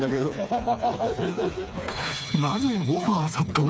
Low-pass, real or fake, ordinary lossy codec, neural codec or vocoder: none; fake; none; codec, 16 kHz, 4 kbps, FreqCodec, smaller model